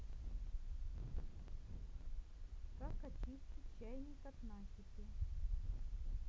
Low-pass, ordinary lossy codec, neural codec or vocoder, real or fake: none; none; none; real